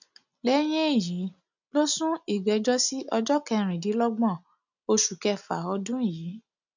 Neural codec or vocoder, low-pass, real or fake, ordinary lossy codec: none; 7.2 kHz; real; none